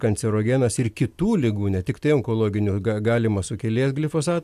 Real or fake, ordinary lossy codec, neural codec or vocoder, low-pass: real; AAC, 96 kbps; none; 14.4 kHz